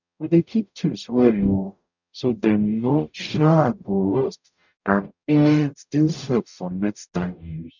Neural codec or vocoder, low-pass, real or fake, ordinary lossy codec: codec, 44.1 kHz, 0.9 kbps, DAC; 7.2 kHz; fake; none